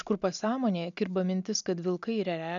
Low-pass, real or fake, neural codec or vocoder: 7.2 kHz; real; none